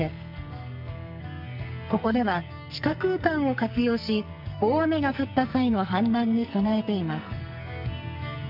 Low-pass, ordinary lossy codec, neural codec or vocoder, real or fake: 5.4 kHz; none; codec, 44.1 kHz, 2.6 kbps, SNAC; fake